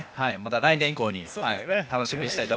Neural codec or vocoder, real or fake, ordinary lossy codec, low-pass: codec, 16 kHz, 0.8 kbps, ZipCodec; fake; none; none